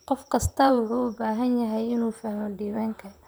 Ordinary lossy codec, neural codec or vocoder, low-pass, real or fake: none; vocoder, 44.1 kHz, 128 mel bands, Pupu-Vocoder; none; fake